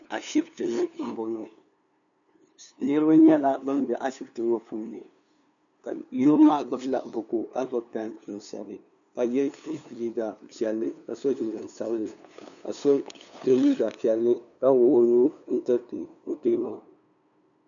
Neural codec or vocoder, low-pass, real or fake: codec, 16 kHz, 2 kbps, FunCodec, trained on LibriTTS, 25 frames a second; 7.2 kHz; fake